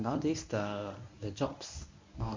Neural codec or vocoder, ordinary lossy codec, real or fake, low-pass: codec, 24 kHz, 0.9 kbps, WavTokenizer, medium speech release version 1; MP3, 64 kbps; fake; 7.2 kHz